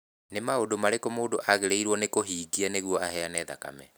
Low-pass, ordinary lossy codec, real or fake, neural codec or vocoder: none; none; real; none